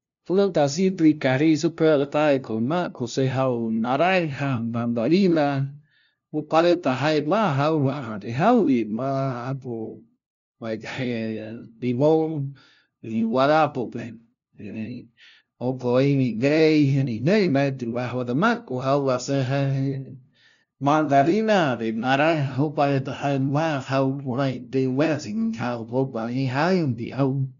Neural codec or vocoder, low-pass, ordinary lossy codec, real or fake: codec, 16 kHz, 0.5 kbps, FunCodec, trained on LibriTTS, 25 frames a second; 7.2 kHz; none; fake